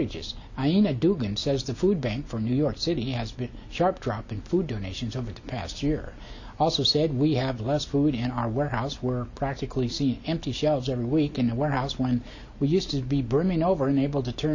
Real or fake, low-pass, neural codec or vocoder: real; 7.2 kHz; none